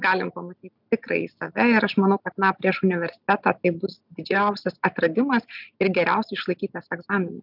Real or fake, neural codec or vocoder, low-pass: real; none; 5.4 kHz